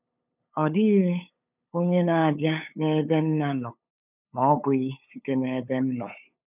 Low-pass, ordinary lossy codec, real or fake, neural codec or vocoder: 3.6 kHz; none; fake; codec, 16 kHz, 8 kbps, FunCodec, trained on LibriTTS, 25 frames a second